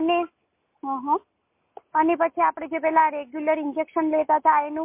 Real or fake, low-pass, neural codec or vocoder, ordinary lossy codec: real; 3.6 kHz; none; MP3, 24 kbps